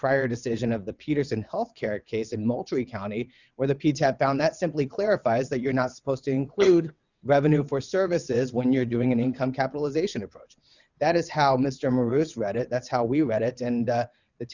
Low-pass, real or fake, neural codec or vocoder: 7.2 kHz; fake; vocoder, 22.05 kHz, 80 mel bands, WaveNeXt